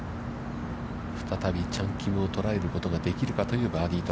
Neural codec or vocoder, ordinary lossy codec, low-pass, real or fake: none; none; none; real